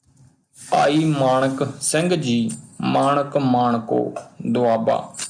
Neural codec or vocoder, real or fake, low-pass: none; real; 9.9 kHz